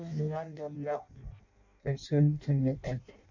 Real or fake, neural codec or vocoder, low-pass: fake; codec, 16 kHz in and 24 kHz out, 0.6 kbps, FireRedTTS-2 codec; 7.2 kHz